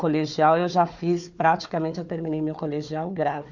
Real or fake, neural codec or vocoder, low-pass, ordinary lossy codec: fake; codec, 16 kHz, 4 kbps, FunCodec, trained on Chinese and English, 50 frames a second; 7.2 kHz; none